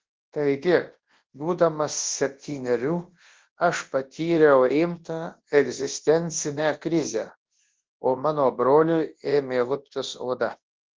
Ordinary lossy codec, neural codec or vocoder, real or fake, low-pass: Opus, 16 kbps; codec, 24 kHz, 0.9 kbps, WavTokenizer, large speech release; fake; 7.2 kHz